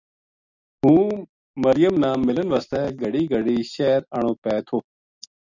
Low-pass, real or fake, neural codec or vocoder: 7.2 kHz; real; none